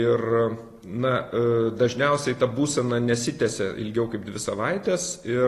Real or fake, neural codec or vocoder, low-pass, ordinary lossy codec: real; none; 14.4 kHz; AAC, 48 kbps